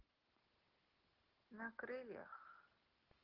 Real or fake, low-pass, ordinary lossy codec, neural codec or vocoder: real; 5.4 kHz; Opus, 16 kbps; none